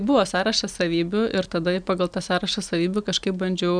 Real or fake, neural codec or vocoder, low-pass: real; none; 9.9 kHz